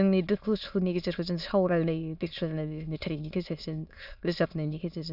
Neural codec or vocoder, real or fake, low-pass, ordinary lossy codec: autoencoder, 22.05 kHz, a latent of 192 numbers a frame, VITS, trained on many speakers; fake; 5.4 kHz; none